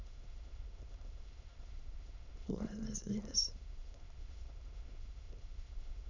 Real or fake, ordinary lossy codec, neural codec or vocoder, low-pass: fake; none; autoencoder, 22.05 kHz, a latent of 192 numbers a frame, VITS, trained on many speakers; 7.2 kHz